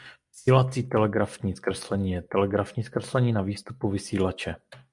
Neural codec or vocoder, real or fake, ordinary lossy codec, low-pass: none; real; MP3, 96 kbps; 10.8 kHz